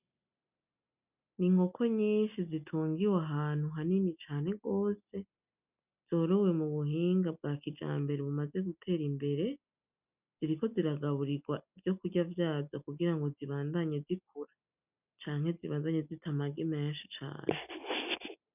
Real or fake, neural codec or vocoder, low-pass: real; none; 3.6 kHz